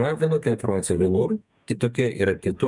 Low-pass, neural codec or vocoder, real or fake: 10.8 kHz; codec, 32 kHz, 1.9 kbps, SNAC; fake